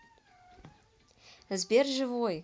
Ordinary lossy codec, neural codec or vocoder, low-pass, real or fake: none; none; none; real